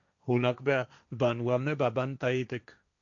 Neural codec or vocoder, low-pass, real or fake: codec, 16 kHz, 1.1 kbps, Voila-Tokenizer; 7.2 kHz; fake